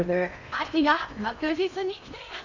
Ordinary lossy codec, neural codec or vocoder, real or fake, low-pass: none; codec, 16 kHz in and 24 kHz out, 0.8 kbps, FocalCodec, streaming, 65536 codes; fake; 7.2 kHz